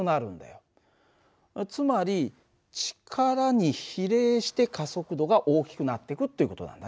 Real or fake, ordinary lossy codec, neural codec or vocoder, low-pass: real; none; none; none